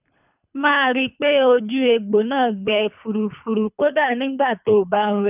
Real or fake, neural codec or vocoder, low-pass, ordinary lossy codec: fake; codec, 24 kHz, 3 kbps, HILCodec; 3.6 kHz; none